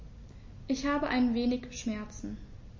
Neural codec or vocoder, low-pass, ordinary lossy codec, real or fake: none; 7.2 kHz; MP3, 32 kbps; real